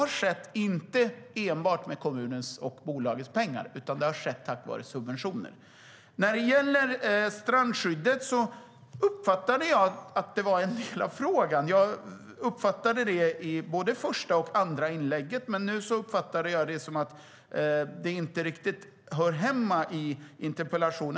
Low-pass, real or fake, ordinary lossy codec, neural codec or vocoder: none; real; none; none